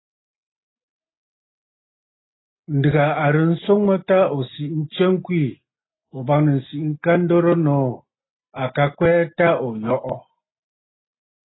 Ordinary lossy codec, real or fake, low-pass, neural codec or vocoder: AAC, 16 kbps; real; 7.2 kHz; none